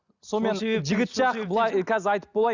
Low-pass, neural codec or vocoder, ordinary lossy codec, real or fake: 7.2 kHz; none; Opus, 64 kbps; real